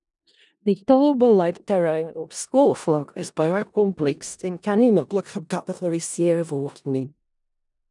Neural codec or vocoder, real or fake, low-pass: codec, 16 kHz in and 24 kHz out, 0.4 kbps, LongCat-Audio-Codec, four codebook decoder; fake; 10.8 kHz